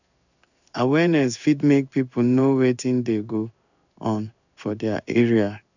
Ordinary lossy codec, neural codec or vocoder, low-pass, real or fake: none; codec, 16 kHz in and 24 kHz out, 1 kbps, XY-Tokenizer; 7.2 kHz; fake